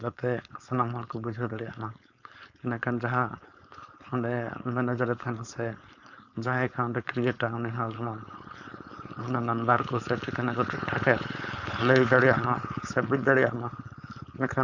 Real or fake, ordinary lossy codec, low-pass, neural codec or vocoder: fake; none; 7.2 kHz; codec, 16 kHz, 4.8 kbps, FACodec